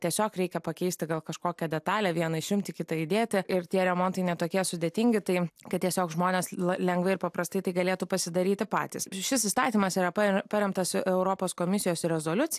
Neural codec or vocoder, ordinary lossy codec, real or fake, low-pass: none; AAC, 96 kbps; real; 14.4 kHz